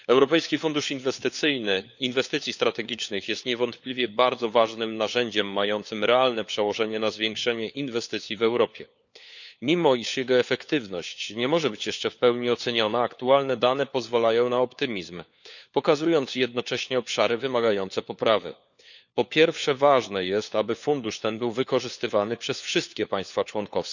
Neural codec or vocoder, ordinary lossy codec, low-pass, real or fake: codec, 16 kHz, 4 kbps, FunCodec, trained on LibriTTS, 50 frames a second; none; 7.2 kHz; fake